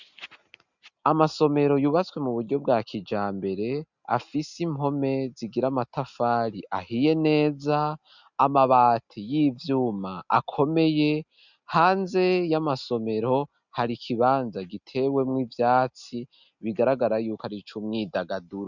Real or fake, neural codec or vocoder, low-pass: real; none; 7.2 kHz